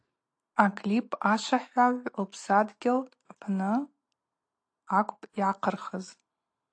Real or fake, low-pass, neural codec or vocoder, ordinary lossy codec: real; 9.9 kHz; none; AAC, 48 kbps